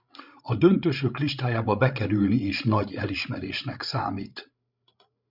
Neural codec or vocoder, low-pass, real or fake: codec, 16 kHz, 16 kbps, FreqCodec, larger model; 5.4 kHz; fake